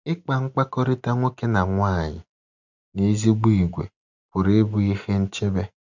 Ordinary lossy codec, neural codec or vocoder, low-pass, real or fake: none; none; 7.2 kHz; real